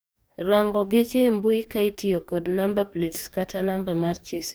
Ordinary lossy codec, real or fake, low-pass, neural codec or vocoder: none; fake; none; codec, 44.1 kHz, 2.6 kbps, DAC